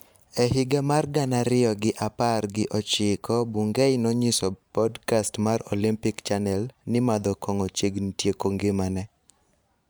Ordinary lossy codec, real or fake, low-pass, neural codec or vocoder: none; fake; none; vocoder, 44.1 kHz, 128 mel bands every 512 samples, BigVGAN v2